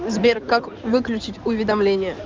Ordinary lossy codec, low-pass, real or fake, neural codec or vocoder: Opus, 32 kbps; 7.2 kHz; fake; codec, 16 kHz in and 24 kHz out, 2.2 kbps, FireRedTTS-2 codec